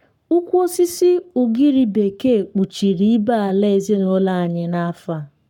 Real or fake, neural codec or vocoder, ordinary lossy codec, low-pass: fake; codec, 44.1 kHz, 7.8 kbps, Pupu-Codec; none; 19.8 kHz